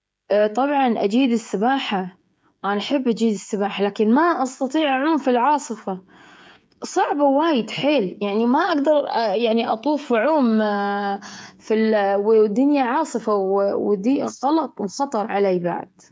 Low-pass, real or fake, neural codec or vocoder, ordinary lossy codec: none; fake; codec, 16 kHz, 16 kbps, FreqCodec, smaller model; none